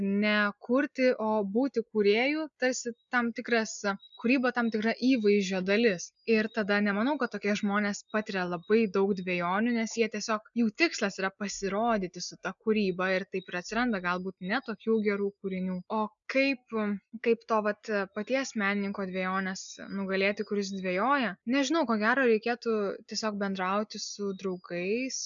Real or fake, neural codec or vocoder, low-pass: real; none; 7.2 kHz